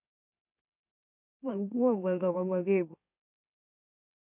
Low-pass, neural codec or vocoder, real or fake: 3.6 kHz; autoencoder, 44.1 kHz, a latent of 192 numbers a frame, MeloTTS; fake